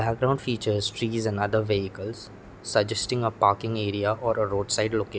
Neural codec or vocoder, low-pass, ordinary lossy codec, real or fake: none; none; none; real